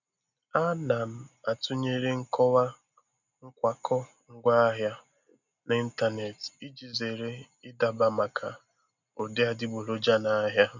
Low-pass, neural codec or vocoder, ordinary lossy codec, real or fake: 7.2 kHz; none; none; real